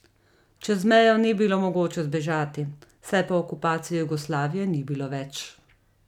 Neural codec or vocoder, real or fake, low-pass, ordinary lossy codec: none; real; 19.8 kHz; none